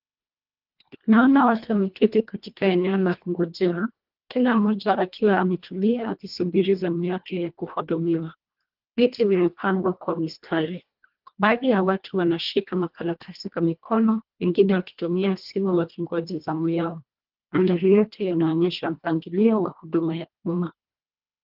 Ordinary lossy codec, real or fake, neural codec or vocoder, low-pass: Opus, 32 kbps; fake; codec, 24 kHz, 1.5 kbps, HILCodec; 5.4 kHz